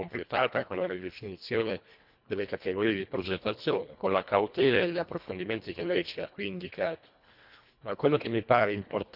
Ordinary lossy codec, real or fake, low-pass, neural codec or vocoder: none; fake; 5.4 kHz; codec, 24 kHz, 1.5 kbps, HILCodec